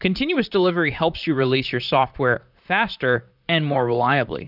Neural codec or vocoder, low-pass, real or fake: vocoder, 44.1 kHz, 128 mel bands, Pupu-Vocoder; 5.4 kHz; fake